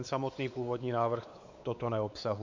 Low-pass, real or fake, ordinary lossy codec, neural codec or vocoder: 7.2 kHz; fake; MP3, 64 kbps; codec, 16 kHz, 4 kbps, X-Codec, WavLM features, trained on Multilingual LibriSpeech